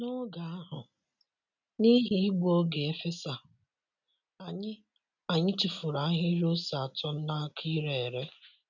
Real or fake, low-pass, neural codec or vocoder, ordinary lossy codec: real; 7.2 kHz; none; none